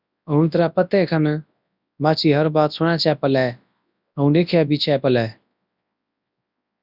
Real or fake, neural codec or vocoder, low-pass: fake; codec, 24 kHz, 0.9 kbps, WavTokenizer, large speech release; 5.4 kHz